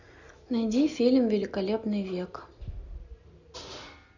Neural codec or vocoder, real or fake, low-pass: none; real; 7.2 kHz